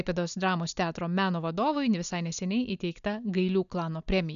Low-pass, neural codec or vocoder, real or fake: 7.2 kHz; none; real